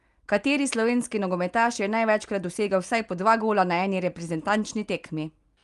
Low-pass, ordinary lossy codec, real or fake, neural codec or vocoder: 10.8 kHz; Opus, 32 kbps; real; none